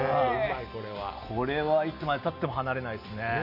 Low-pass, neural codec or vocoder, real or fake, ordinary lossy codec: 5.4 kHz; none; real; none